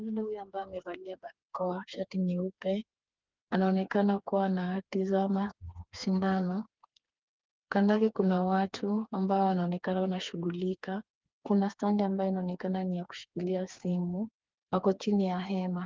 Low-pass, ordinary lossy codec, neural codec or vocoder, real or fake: 7.2 kHz; Opus, 24 kbps; codec, 16 kHz, 4 kbps, FreqCodec, smaller model; fake